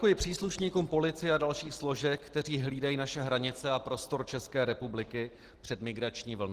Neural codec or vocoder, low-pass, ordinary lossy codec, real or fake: none; 14.4 kHz; Opus, 16 kbps; real